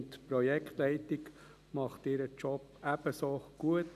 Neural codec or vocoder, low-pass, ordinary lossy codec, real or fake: none; 14.4 kHz; none; real